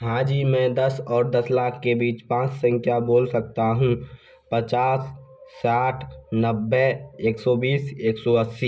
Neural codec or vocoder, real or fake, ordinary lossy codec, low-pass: none; real; none; none